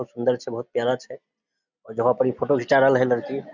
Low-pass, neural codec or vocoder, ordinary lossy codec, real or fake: 7.2 kHz; none; Opus, 64 kbps; real